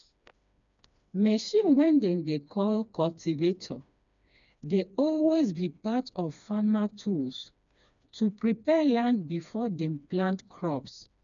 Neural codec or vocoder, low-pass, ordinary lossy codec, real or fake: codec, 16 kHz, 2 kbps, FreqCodec, smaller model; 7.2 kHz; none; fake